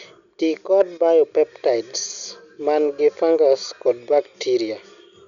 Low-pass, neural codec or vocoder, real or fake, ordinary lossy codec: 7.2 kHz; none; real; none